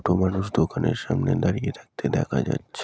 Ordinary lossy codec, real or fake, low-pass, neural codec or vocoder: none; real; none; none